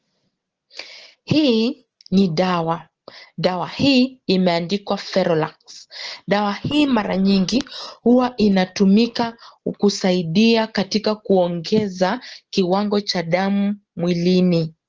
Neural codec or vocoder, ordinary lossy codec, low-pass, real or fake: none; Opus, 16 kbps; 7.2 kHz; real